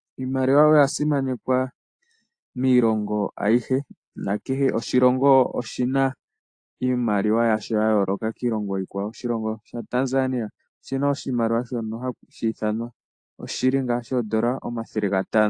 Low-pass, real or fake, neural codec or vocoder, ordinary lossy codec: 9.9 kHz; real; none; AAC, 48 kbps